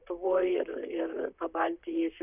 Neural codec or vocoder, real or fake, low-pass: vocoder, 44.1 kHz, 128 mel bands, Pupu-Vocoder; fake; 3.6 kHz